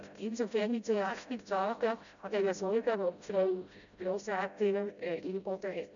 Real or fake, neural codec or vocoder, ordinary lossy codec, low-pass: fake; codec, 16 kHz, 0.5 kbps, FreqCodec, smaller model; none; 7.2 kHz